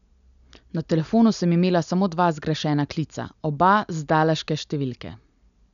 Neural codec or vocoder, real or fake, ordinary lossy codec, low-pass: none; real; none; 7.2 kHz